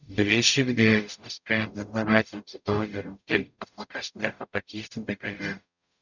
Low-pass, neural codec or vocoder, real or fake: 7.2 kHz; codec, 44.1 kHz, 0.9 kbps, DAC; fake